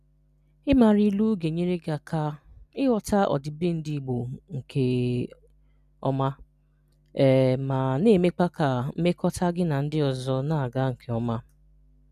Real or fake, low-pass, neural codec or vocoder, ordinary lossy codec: real; 14.4 kHz; none; none